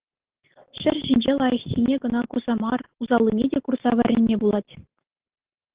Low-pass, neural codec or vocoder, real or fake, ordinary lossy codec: 3.6 kHz; none; real; Opus, 16 kbps